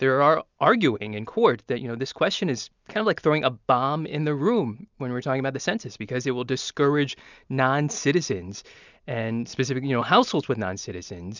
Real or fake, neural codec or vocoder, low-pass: real; none; 7.2 kHz